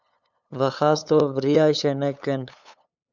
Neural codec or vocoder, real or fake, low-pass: codec, 16 kHz, 8 kbps, FunCodec, trained on LibriTTS, 25 frames a second; fake; 7.2 kHz